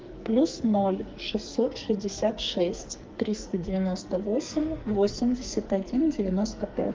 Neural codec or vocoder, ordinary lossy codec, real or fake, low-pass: codec, 44.1 kHz, 2.6 kbps, SNAC; Opus, 24 kbps; fake; 7.2 kHz